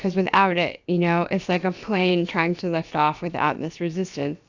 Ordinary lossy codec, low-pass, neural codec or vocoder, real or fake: Opus, 64 kbps; 7.2 kHz; codec, 16 kHz, about 1 kbps, DyCAST, with the encoder's durations; fake